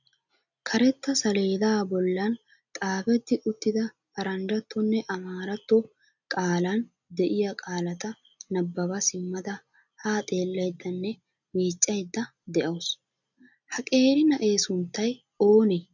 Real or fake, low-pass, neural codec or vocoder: real; 7.2 kHz; none